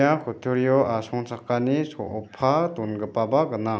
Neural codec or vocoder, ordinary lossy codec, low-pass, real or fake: none; none; none; real